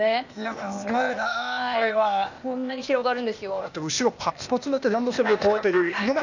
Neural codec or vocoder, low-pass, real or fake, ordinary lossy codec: codec, 16 kHz, 0.8 kbps, ZipCodec; 7.2 kHz; fake; none